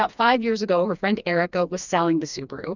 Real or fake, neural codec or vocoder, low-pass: fake; codec, 16 kHz, 2 kbps, FreqCodec, smaller model; 7.2 kHz